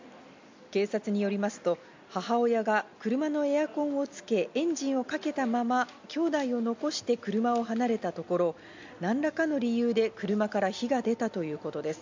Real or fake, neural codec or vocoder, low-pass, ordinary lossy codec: real; none; 7.2 kHz; MP3, 64 kbps